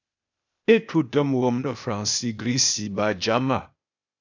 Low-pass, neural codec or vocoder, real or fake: 7.2 kHz; codec, 16 kHz, 0.8 kbps, ZipCodec; fake